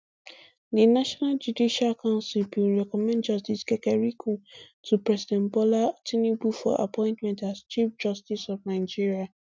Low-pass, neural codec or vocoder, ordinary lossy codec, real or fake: none; none; none; real